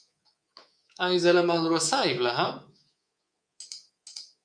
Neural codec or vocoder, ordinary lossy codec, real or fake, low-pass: codec, 24 kHz, 3.1 kbps, DualCodec; Opus, 64 kbps; fake; 9.9 kHz